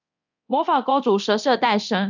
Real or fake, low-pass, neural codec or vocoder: fake; 7.2 kHz; codec, 24 kHz, 0.9 kbps, DualCodec